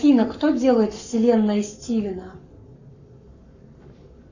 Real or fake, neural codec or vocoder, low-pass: fake; codec, 44.1 kHz, 7.8 kbps, Pupu-Codec; 7.2 kHz